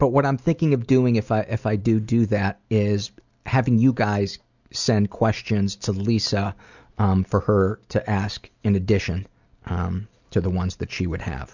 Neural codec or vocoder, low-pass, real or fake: none; 7.2 kHz; real